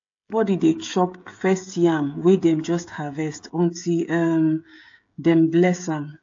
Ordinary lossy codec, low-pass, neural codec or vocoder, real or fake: AAC, 48 kbps; 7.2 kHz; codec, 16 kHz, 16 kbps, FreqCodec, smaller model; fake